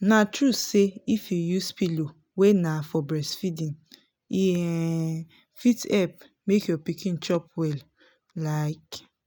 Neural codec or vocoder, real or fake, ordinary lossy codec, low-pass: none; real; none; none